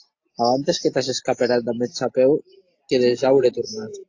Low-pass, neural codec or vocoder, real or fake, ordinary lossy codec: 7.2 kHz; none; real; AAC, 48 kbps